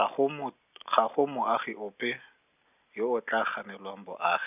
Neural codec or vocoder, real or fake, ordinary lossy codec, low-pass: none; real; none; 3.6 kHz